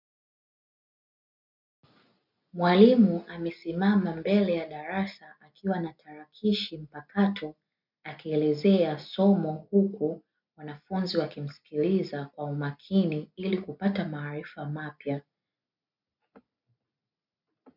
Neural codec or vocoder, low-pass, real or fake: none; 5.4 kHz; real